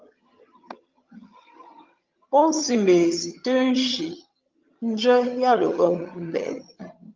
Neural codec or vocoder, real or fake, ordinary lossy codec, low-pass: vocoder, 22.05 kHz, 80 mel bands, HiFi-GAN; fake; Opus, 24 kbps; 7.2 kHz